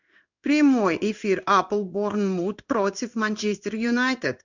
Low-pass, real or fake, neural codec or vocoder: 7.2 kHz; fake; codec, 16 kHz in and 24 kHz out, 1 kbps, XY-Tokenizer